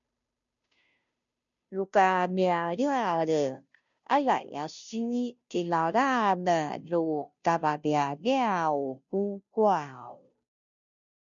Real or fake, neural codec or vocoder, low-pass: fake; codec, 16 kHz, 0.5 kbps, FunCodec, trained on Chinese and English, 25 frames a second; 7.2 kHz